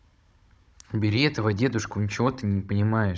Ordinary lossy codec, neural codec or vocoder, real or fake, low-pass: none; codec, 16 kHz, 16 kbps, FunCodec, trained on Chinese and English, 50 frames a second; fake; none